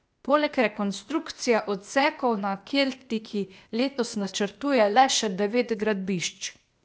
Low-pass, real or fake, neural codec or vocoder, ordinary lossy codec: none; fake; codec, 16 kHz, 0.8 kbps, ZipCodec; none